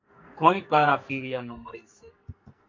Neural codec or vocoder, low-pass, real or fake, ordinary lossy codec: codec, 44.1 kHz, 2.6 kbps, SNAC; 7.2 kHz; fake; MP3, 64 kbps